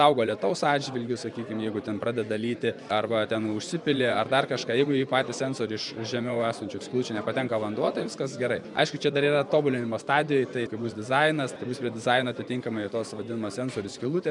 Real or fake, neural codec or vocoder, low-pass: fake; vocoder, 24 kHz, 100 mel bands, Vocos; 10.8 kHz